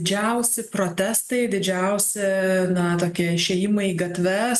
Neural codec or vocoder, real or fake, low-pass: none; real; 14.4 kHz